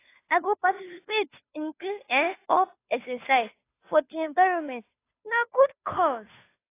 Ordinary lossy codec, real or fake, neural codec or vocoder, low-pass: AAC, 24 kbps; fake; codec, 16 kHz, 2 kbps, FunCodec, trained on Chinese and English, 25 frames a second; 3.6 kHz